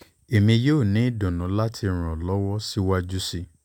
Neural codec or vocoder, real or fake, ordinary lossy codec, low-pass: none; real; none; 19.8 kHz